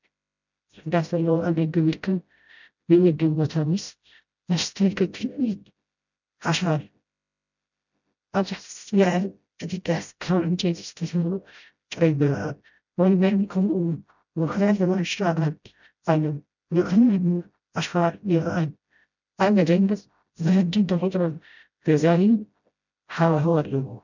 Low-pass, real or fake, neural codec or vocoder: 7.2 kHz; fake; codec, 16 kHz, 0.5 kbps, FreqCodec, smaller model